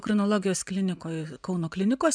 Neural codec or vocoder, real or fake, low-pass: vocoder, 24 kHz, 100 mel bands, Vocos; fake; 9.9 kHz